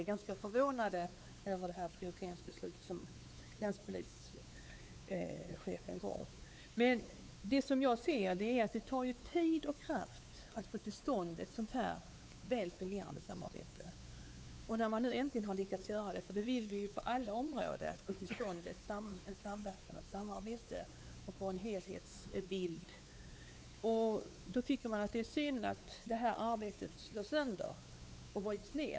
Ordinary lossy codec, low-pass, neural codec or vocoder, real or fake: none; none; codec, 16 kHz, 4 kbps, X-Codec, WavLM features, trained on Multilingual LibriSpeech; fake